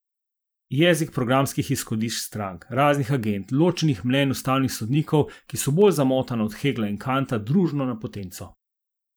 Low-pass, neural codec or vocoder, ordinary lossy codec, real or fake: none; vocoder, 44.1 kHz, 128 mel bands every 512 samples, BigVGAN v2; none; fake